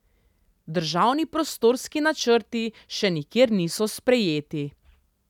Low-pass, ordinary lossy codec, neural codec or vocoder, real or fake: 19.8 kHz; none; none; real